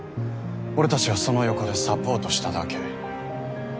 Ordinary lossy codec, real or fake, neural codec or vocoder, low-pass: none; real; none; none